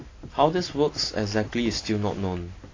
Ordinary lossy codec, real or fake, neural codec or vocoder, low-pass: AAC, 32 kbps; real; none; 7.2 kHz